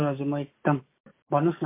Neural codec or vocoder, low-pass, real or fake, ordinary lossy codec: none; 3.6 kHz; real; MP3, 24 kbps